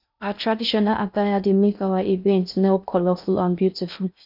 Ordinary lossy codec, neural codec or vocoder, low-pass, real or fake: none; codec, 16 kHz in and 24 kHz out, 0.6 kbps, FocalCodec, streaming, 2048 codes; 5.4 kHz; fake